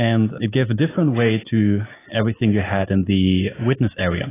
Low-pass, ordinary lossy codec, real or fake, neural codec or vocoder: 3.6 kHz; AAC, 16 kbps; real; none